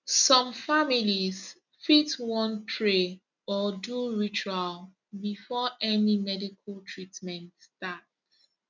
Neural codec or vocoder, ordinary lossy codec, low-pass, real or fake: none; none; 7.2 kHz; real